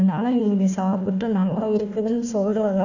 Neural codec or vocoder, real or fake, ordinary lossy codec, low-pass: codec, 16 kHz, 1 kbps, FunCodec, trained on Chinese and English, 50 frames a second; fake; none; 7.2 kHz